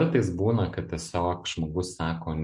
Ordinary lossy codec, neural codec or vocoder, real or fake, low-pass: MP3, 64 kbps; none; real; 10.8 kHz